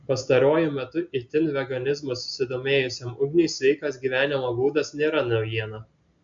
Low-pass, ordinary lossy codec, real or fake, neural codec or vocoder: 7.2 kHz; AAC, 64 kbps; real; none